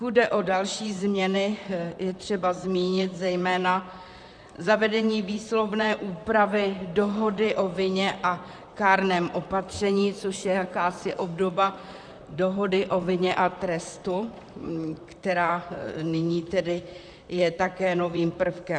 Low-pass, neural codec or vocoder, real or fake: 9.9 kHz; vocoder, 44.1 kHz, 128 mel bands, Pupu-Vocoder; fake